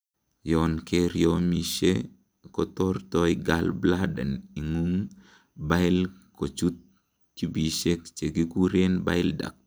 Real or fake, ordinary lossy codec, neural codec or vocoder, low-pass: real; none; none; none